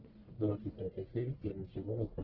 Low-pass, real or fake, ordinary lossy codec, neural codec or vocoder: 5.4 kHz; fake; Opus, 16 kbps; codec, 44.1 kHz, 1.7 kbps, Pupu-Codec